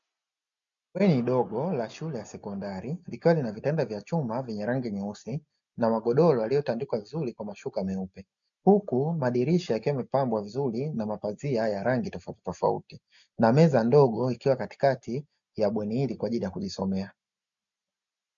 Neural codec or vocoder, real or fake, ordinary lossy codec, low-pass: none; real; MP3, 96 kbps; 7.2 kHz